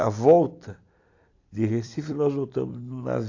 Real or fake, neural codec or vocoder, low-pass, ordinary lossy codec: fake; vocoder, 44.1 kHz, 128 mel bands every 256 samples, BigVGAN v2; 7.2 kHz; AAC, 48 kbps